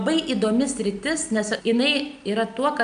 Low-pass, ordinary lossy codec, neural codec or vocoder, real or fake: 9.9 kHz; AAC, 96 kbps; none; real